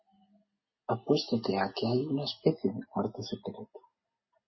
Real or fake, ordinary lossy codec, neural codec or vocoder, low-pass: real; MP3, 24 kbps; none; 7.2 kHz